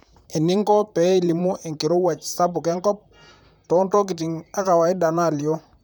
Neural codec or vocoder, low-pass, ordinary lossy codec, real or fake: vocoder, 44.1 kHz, 128 mel bands, Pupu-Vocoder; none; none; fake